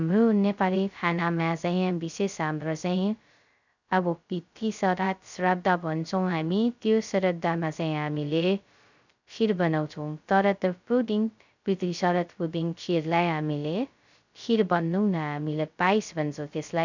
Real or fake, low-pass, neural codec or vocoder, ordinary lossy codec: fake; 7.2 kHz; codec, 16 kHz, 0.2 kbps, FocalCodec; none